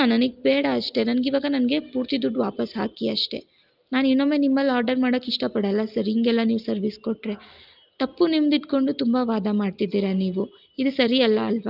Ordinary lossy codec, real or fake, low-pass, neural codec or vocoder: Opus, 24 kbps; real; 5.4 kHz; none